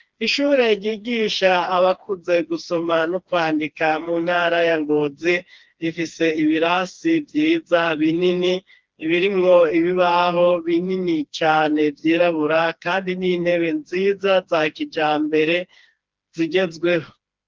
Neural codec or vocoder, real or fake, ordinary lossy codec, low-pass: codec, 16 kHz, 2 kbps, FreqCodec, smaller model; fake; Opus, 32 kbps; 7.2 kHz